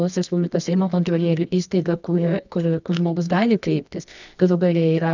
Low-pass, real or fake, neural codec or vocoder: 7.2 kHz; fake; codec, 24 kHz, 0.9 kbps, WavTokenizer, medium music audio release